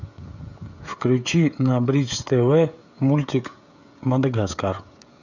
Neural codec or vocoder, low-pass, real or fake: codec, 16 kHz, 16 kbps, FreqCodec, smaller model; 7.2 kHz; fake